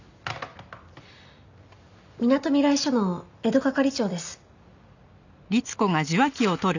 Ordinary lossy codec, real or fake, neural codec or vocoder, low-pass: none; real; none; 7.2 kHz